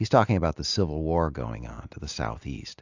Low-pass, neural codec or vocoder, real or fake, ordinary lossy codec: 7.2 kHz; none; real; AAC, 48 kbps